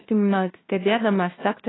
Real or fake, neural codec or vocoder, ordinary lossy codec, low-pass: fake; codec, 16 kHz, 0.5 kbps, FunCodec, trained on LibriTTS, 25 frames a second; AAC, 16 kbps; 7.2 kHz